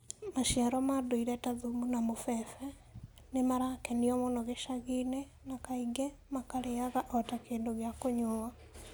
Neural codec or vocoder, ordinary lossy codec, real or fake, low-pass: none; none; real; none